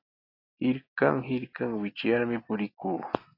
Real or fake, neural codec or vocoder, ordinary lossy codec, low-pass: real; none; AAC, 24 kbps; 5.4 kHz